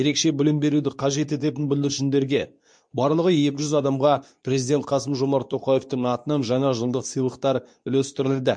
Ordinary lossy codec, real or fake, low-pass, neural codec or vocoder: MP3, 64 kbps; fake; 9.9 kHz; codec, 24 kHz, 0.9 kbps, WavTokenizer, medium speech release version 2